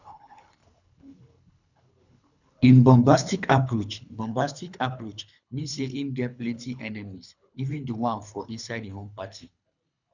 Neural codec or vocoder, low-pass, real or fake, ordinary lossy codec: codec, 24 kHz, 3 kbps, HILCodec; 7.2 kHz; fake; none